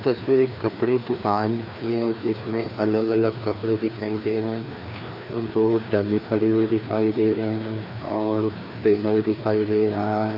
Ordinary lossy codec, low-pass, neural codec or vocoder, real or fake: none; 5.4 kHz; codec, 16 kHz, 2 kbps, FreqCodec, larger model; fake